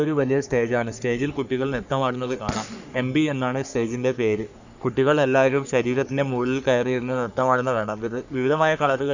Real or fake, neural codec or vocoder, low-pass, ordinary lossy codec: fake; codec, 44.1 kHz, 3.4 kbps, Pupu-Codec; 7.2 kHz; none